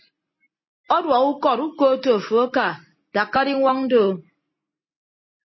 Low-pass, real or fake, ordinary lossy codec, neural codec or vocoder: 7.2 kHz; real; MP3, 24 kbps; none